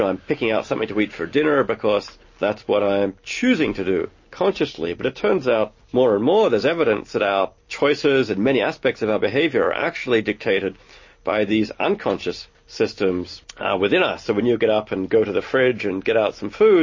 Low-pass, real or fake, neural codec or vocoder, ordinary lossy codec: 7.2 kHz; real; none; MP3, 32 kbps